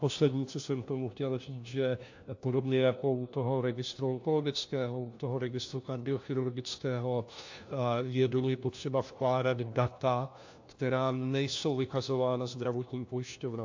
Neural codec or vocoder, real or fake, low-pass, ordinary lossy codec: codec, 16 kHz, 1 kbps, FunCodec, trained on LibriTTS, 50 frames a second; fake; 7.2 kHz; AAC, 48 kbps